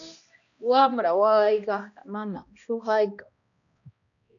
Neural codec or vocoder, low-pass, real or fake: codec, 16 kHz, 1 kbps, X-Codec, HuBERT features, trained on balanced general audio; 7.2 kHz; fake